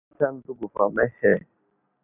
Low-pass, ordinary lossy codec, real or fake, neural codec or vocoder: 3.6 kHz; AAC, 32 kbps; real; none